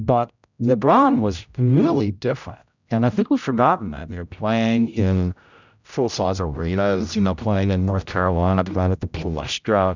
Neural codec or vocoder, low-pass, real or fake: codec, 16 kHz, 0.5 kbps, X-Codec, HuBERT features, trained on general audio; 7.2 kHz; fake